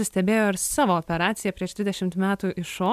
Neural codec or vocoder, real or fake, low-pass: none; real; 14.4 kHz